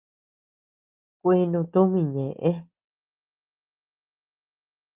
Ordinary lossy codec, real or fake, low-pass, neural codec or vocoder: Opus, 24 kbps; real; 3.6 kHz; none